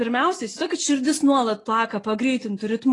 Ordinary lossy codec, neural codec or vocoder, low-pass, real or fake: AAC, 32 kbps; none; 10.8 kHz; real